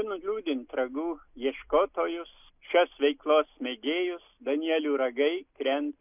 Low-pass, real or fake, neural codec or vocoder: 3.6 kHz; real; none